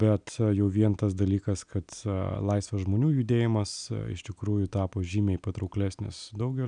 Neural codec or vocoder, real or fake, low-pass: none; real; 9.9 kHz